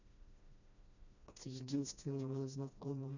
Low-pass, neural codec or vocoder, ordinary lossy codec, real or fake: 7.2 kHz; codec, 16 kHz, 1 kbps, FreqCodec, smaller model; none; fake